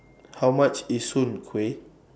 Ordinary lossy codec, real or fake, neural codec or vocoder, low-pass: none; real; none; none